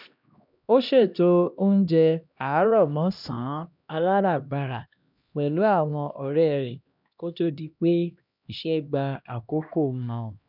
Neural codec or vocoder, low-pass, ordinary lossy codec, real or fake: codec, 16 kHz, 1 kbps, X-Codec, HuBERT features, trained on LibriSpeech; 5.4 kHz; none; fake